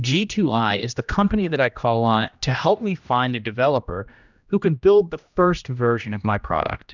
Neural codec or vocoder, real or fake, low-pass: codec, 16 kHz, 1 kbps, X-Codec, HuBERT features, trained on general audio; fake; 7.2 kHz